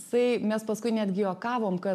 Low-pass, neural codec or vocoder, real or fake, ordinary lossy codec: 14.4 kHz; none; real; MP3, 96 kbps